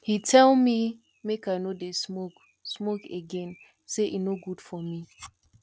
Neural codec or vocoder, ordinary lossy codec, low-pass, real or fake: none; none; none; real